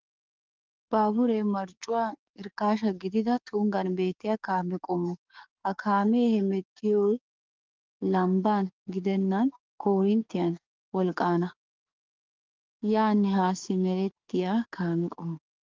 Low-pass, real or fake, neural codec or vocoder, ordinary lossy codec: 7.2 kHz; fake; codec, 44.1 kHz, 7.8 kbps, DAC; Opus, 32 kbps